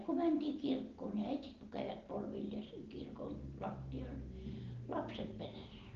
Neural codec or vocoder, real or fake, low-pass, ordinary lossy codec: none; real; 7.2 kHz; Opus, 16 kbps